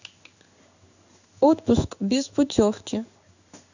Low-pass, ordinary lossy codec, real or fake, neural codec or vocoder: 7.2 kHz; none; fake; codec, 16 kHz in and 24 kHz out, 1 kbps, XY-Tokenizer